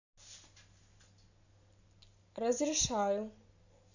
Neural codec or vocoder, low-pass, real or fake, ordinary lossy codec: none; 7.2 kHz; real; none